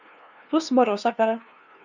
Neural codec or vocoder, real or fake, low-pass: codec, 16 kHz, 2 kbps, FunCodec, trained on LibriTTS, 25 frames a second; fake; 7.2 kHz